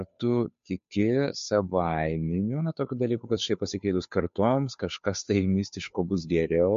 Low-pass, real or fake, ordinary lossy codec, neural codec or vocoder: 7.2 kHz; fake; MP3, 48 kbps; codec, 16 kHz, 2 kbps, FunCodec, trained on Chinese and English, 25 frames a second